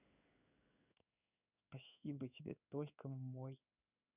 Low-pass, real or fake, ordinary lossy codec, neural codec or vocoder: 3.6 kHz; fake; none; codec, 16 kHz in and 24 kHz out, 1 kbps, XY-Tokenizer